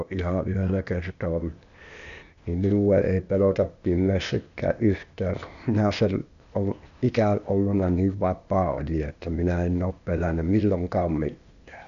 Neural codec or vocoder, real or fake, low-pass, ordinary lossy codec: codec, 16 kHz, 0.8 kbps, ZipCodec; fake; 7.2 kHz; none